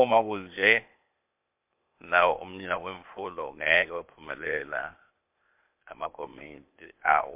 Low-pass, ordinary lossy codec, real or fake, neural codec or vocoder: 3.6 kHz; MP3, 32 kbps; fake; codec, 16 kHz, 0.8 kbps, ZipCodec